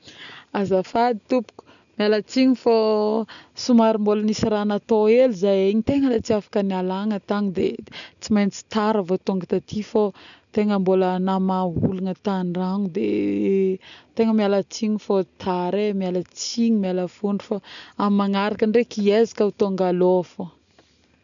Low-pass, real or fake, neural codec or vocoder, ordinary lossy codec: 7.2 kHz; real; none; none